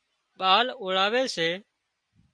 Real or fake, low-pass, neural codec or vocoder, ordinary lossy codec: real; 9.9 kHz; none; MP3, 48 kbps